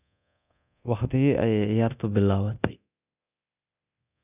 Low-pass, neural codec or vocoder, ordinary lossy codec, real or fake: 3.6 kHz; codec, 24 kHz, 0.9 kbps, DualCodec; none; fake